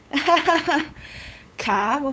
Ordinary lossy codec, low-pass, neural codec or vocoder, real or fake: none; none; codec, 16 kHz, 8 kbps, FunCodec, trained on LibriTTS, 25 frames a second; fake